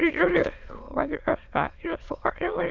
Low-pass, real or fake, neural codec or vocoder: 7.2 kHz; fake; autoencoder, 22.05 kHz, a latent of 192 numbers a frame, VITS, trained on many speakers